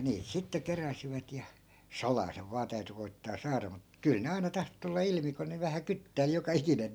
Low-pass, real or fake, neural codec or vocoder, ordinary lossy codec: none; real; none; none